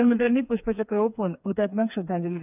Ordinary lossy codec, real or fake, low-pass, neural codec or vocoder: none; fake; 3.6 kHz; codec, 16 kHz, 4 kbps, FreqCodec, smaller model